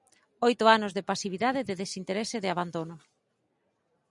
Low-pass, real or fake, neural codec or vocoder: 10.8 kHz; real; none